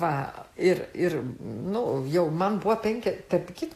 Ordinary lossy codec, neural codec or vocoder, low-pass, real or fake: AAC, 64 kbps; none; 14.4 kHz; real